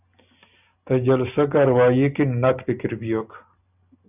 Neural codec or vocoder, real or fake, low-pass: none; real; 3.6 kHz